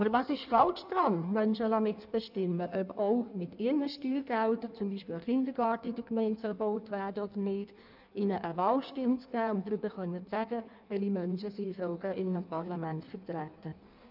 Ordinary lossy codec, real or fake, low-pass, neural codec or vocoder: none; fake; 5.4 kHz; codec, 16 kHz in and 24 kHz out, 1.1 kbps, FireRedTTS-2 codec